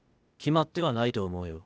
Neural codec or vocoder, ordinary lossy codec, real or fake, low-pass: codec, 16 kHz, 0.8 kbps, ZipCodec; none; fake; none